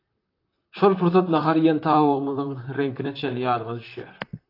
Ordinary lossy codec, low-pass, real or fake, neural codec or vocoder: AAC, 32 kbps; 5.4 kHz; fake; vocoder, 44.1 kHz, 128 mel bands, Pupu-Vocoder